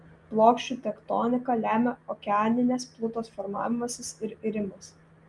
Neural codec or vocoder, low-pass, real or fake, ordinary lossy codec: none; 10.8 kHz; real; Opus, 32 kbps